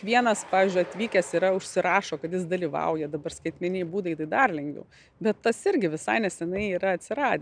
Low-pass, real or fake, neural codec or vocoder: 9.9 kHz; real; none